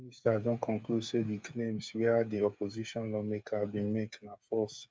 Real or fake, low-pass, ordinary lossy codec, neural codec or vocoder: fake; none; none; codec, 16 kHz, 8 kbps, FreqCodec, smaller model